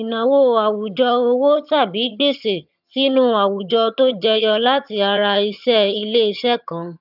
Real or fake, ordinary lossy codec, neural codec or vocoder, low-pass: fake; none; vocoder, 22.05 kHz, 80 mel bands, HiFi-GAN; 5.4 kHz